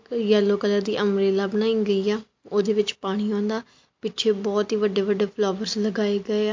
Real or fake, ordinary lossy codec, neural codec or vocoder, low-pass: real; MP3, 48 kbps; none; 7.2 kHz